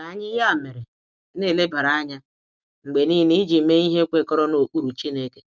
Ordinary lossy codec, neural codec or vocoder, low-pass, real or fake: none; none; none; real